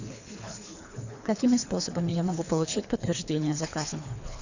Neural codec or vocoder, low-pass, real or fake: codec, 24 kHz, 3 kbps, HILCodec; 7.2 kHz; fake